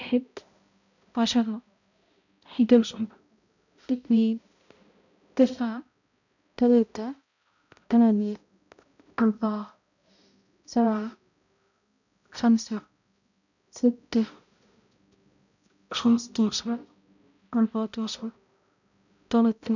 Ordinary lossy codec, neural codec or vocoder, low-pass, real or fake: none; codec, 16 kHz, 0.5 kbps, X-Codec, HuBERT features, trained on balanced general audio; 7.2 kHz; fake